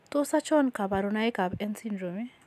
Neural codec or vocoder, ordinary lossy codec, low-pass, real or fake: none; none; 14.4 kHz; real